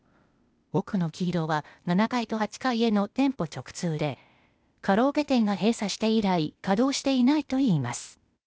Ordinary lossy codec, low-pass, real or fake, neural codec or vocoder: none; none; fake; codec, 16 kHz, 0.8 kbps, ZipCodec